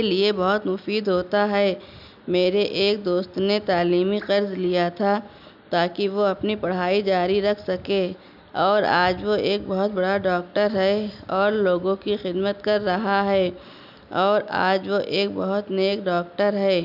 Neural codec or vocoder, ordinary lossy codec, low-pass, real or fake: none; none; 5.4 kHz; real